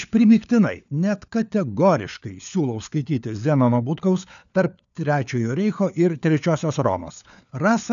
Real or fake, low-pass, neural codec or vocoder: fake; 7.2 kHz; codec, 16 kHz, 4 kbps, FunCodec, trained on LibriTTS, 50 frames a second